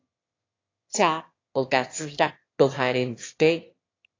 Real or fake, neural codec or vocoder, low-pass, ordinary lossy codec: fake; autoencoder, 22.05 kHz, a latent of 192 numbers a frame, VITS, trained on one speaker; 7.2 kHz; AAC, 32 kbps